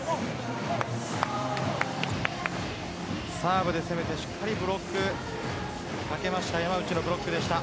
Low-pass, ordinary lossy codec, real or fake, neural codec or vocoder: none; none; real; none